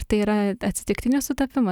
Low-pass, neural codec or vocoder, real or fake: 19.8 kHz; none; real